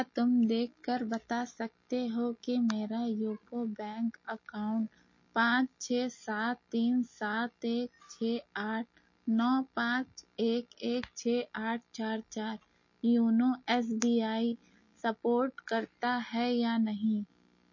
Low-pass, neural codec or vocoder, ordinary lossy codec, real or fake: 7.2 kHz; none; MP3, 32 kbps; real